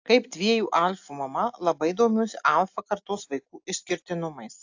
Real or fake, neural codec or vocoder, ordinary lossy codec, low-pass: real; none; AAC, 48 kbps; 7.2 kHz